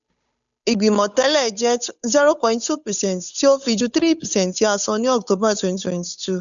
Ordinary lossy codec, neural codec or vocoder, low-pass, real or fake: none; codec, 16 kHz, 8 kbps, FunCodec, trained on Chinese and English, 25 frames a second; 7.2 kHz; fake